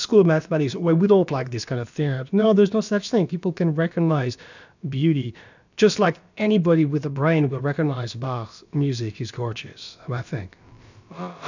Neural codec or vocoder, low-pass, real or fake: codec, 16 kHz, about 1 kbps, DyCAST, with the encoder's durations; 7.2 kHz; fake